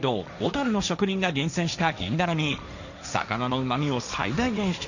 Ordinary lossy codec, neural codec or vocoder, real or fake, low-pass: none; codec, 16 kHz, 1.1 kbps, Voila-Tokenizer; fake; 7.2 kHz